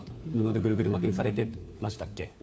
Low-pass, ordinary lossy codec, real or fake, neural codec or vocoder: none; none; fake; codec, 16 kHz, 4 kbps, FunCodec, trained on LibriTTS, 50 frames a second